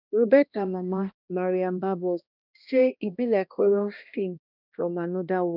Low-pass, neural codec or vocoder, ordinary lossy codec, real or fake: 5.4 kHz; codec, 16 kHz, 1 kbps, X-Codec, HuBERT features, trained on balanced general audio; none; fake